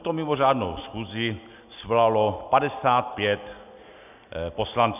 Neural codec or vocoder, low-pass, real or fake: none; 3.6 kHz; real